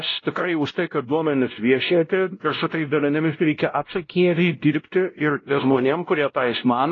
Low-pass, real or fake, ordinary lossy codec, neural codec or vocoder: 7.2 kHz; fake; AAC, 32 kbps; codec, 16 kHz, 0.5 kbps, X-Codec, WavLM features, trained on Multilingual LibriSpeech